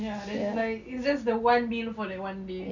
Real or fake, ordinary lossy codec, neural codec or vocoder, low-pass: real; none; none; 7.2 kHz